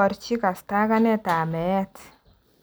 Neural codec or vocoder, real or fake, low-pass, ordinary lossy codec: none; real; none; none